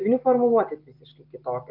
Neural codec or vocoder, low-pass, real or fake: none; 5.4 kHz; real